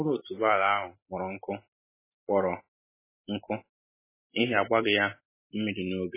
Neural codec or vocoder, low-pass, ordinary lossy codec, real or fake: none; 3.6 kHz; MP3, 16 kbps; real